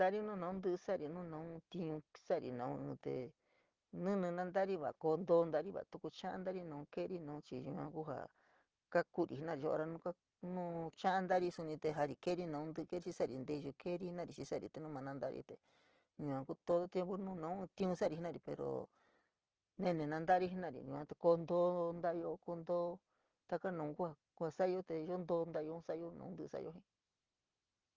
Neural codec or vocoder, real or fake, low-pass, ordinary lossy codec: none; real; 7.2 kHz; Opus, 16 kbps